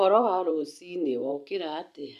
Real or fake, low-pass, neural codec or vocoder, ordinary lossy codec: fake; 14.4 kHz; vocoder, 44.1 kHz, 128 mel bands every 512 samples, BigVGAN v2; none